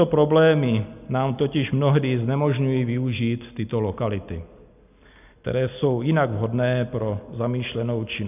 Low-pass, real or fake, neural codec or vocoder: 3.6 kHz; real; none